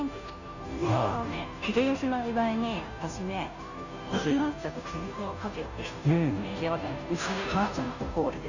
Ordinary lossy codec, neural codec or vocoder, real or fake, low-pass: none; codec, 16 kHz, 0.5 kbps, FunCodec, trained on Chinese and English, 25 frames a second; fake; 7.2 kHz